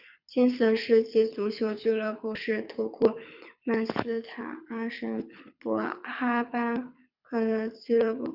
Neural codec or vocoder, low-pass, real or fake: codec, 24 kHz, 6 kbps, HILCodec; 5.4 kHz; fake